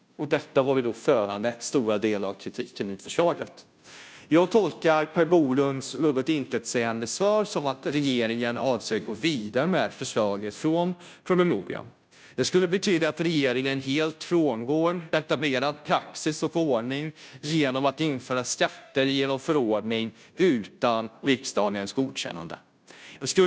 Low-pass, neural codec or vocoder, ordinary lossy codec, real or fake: none; codec, 16 kHz, 0.5 kbps, FunCodec, trained on Chinese and English, 25 frames a second; none; fake